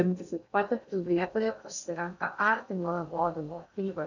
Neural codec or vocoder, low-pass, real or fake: codec, 16 kHz in and 24 kHz out, 0.6 kbps, FocalCodec, streaming, 2048 codes; 7.2 kHz; fake